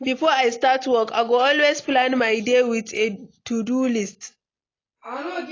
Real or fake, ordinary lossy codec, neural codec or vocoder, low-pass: real; AAC, 32 kbps; none; 7.2 kHz